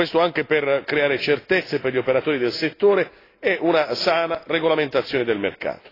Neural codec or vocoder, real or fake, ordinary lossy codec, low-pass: none; real; AAC, 24 kbps; 5.4 kHz